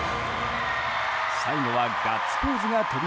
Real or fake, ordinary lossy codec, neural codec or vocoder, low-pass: real; none; none; none